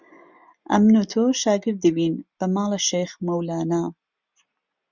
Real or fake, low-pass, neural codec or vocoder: real; 7.2 kHz; none